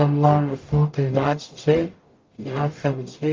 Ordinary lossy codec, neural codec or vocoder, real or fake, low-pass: Opus, 24 kbps; codec, 44.1 kHz, 0.9 kbps, DAC; fake; 7.2 kHz